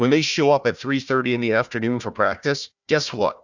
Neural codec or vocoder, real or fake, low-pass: codec, 16 kHz, 1 kbps, FunCodec, trained on Chinese and English, 50 frames a second; fake; 7.2 kHz